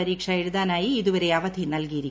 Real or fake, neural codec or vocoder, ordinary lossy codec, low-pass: real; none; none; none